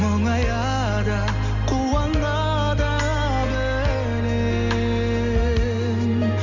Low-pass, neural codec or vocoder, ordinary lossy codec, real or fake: 7.2 kHz; none; none; real